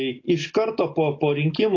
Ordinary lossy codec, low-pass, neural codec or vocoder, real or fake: AAC, 32 kbps; 7.2 kHz; none; real